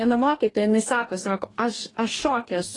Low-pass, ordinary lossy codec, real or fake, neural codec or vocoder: 10.8 kHz; AAC, 32 kbps; fake; codec, 44.1 kHz, 2.6 kbps, DAC